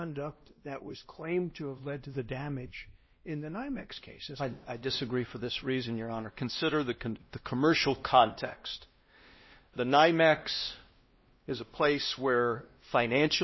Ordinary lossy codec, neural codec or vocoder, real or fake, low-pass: MP3, 24 kbps; codec, 16 kHz, 1 kbps, X-Codec, WavLM features, trained on Multilingual LibriSpeech; fake; 7.2 kHz